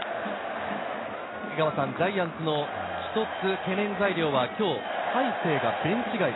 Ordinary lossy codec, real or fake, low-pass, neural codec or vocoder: AAC, 16 kbps; real; 7.2 kHz; none